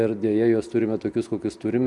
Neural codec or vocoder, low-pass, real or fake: none; 10.8 kHz; real